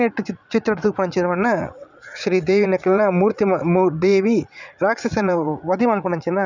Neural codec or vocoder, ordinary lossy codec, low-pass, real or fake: vocoder, 44.1 kHz, 80 mel bands, Vocos; none; 7.2 kHz; fake